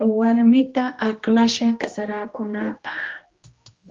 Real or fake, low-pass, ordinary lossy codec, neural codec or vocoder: fake; 7.2 kHz; Opus, 32 kbps; codec, 16 kHz, 1 kbps, X-Codec, HuBERT features, trained on general audio